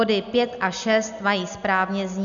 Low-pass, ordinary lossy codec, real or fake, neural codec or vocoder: 7.2 kHz; MP3, 96 kbps; real; none